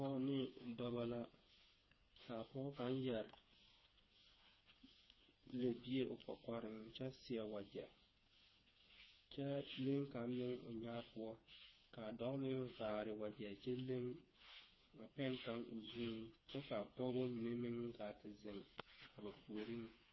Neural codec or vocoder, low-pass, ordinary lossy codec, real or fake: codec, 16 kHz, 4 kbps, FreqCodec, smaller model; 7.2 kHz; MP3, 24 kbps; fake